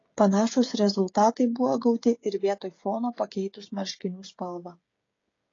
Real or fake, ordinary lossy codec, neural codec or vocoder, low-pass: fake; AAC, 32 kbps; codec, 16 kHz, 16 kbps, FreqCodec, smaller model; 7.2 kHz